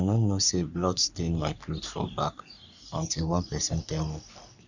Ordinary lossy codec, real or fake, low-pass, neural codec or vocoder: none; fake; 7.2 kHz; codec, 44.1 kHz, 3.4 kbps, Pupu-Codec